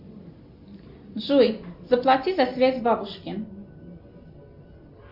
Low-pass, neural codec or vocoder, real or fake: 5.4 kHz; none; real